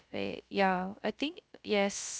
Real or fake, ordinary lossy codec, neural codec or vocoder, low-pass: fake; none; codec, 16 kHz, 0.3 kbps, FocalCodec; none